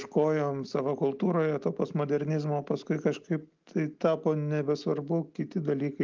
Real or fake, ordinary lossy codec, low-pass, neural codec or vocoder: real; Opus, 24 kbps; 7.2 kHz; none